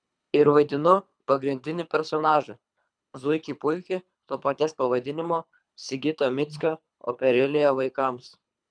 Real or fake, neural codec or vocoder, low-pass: fake; codec, 24 kHz, 3 kbps, HILCodec; 9.9 kHz